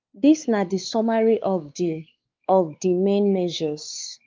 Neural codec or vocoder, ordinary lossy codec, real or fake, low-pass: codec, 16 kHz, 4 kbps, X-Codec, WavLM features, trained on Multilingual LibriSpeech; Opus, 24 kbps; fake; 7.2 kHz